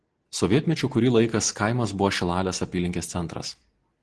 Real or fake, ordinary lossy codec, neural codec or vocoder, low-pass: real; Opus, 16 kbps; none; 10.8 kHz